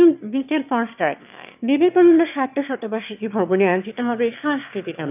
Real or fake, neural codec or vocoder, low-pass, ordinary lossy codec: fake; autoencoder, 22.05 kHz, a latent of 192 numbers a frame, VITS, trained on one speaker; 3.6 kHz; AAC, 32 kbps